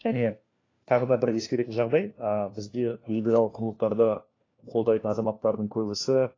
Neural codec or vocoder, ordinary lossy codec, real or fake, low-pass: codec, 16 kHz, 1 kbps, FunCodec, trained on LibriTTS, 50 frames a second; AAC, 32 kbps; fake; 7.2 kHz